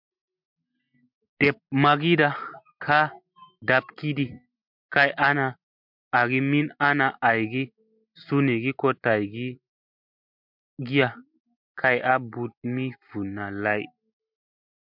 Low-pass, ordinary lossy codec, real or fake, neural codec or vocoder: 5.4 kHz; MP3, 48 kbps; real; none